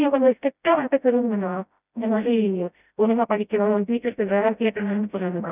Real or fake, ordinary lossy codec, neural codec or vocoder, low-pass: fake; none; codec, 16 kHz, 0.5 kbps, FreqCodec, smaller model; 3.6 kHz